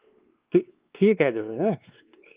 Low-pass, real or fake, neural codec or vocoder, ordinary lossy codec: 3.6 kHz; fake; codec, 16 kHz, 2 kbps, X-Codec, WavLM features, trained on Multilingual LibriSpeech; Opus, 32 kbps